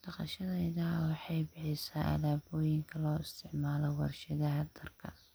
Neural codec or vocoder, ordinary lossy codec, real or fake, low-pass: none; none; real; none